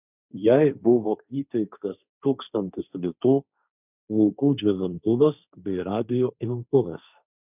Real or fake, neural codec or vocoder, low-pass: fake; codec, 16 kHz, 1.1 kbps, Voila-Tokenizer; 3.6 kHz